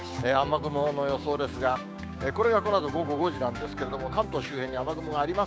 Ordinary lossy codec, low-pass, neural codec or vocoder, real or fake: none; none; codec, 16 kHz, 6 kbps, DAC; fake